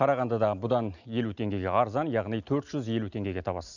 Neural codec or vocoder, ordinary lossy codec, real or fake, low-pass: none; none; real; 7.2 kHz